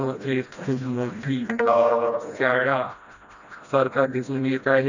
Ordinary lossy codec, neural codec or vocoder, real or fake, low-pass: none; codec, 16 kHz, 1 kbps, FreqCodec, smaller model; fake; 7.2 kHz